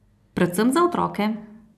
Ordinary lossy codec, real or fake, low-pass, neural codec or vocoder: none; real; 14.4 kHz; none